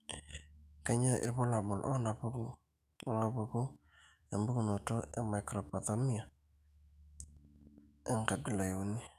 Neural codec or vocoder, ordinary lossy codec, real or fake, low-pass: autoencoder, 48 kHz, 128 numbers a frame, DAC-VAE, trained on Japanese speech; none; fake; 14.4 kHz